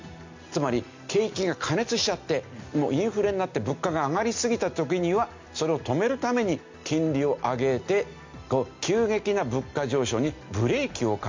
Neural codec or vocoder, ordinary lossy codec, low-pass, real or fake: none; MP3, 64 kbps; 7.2 kHz; real